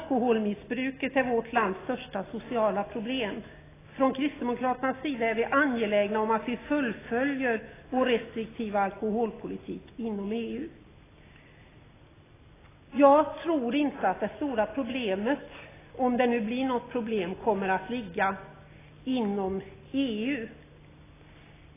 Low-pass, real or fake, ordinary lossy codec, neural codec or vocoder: 3.6 kHz; real; AAC, 16 kbps; none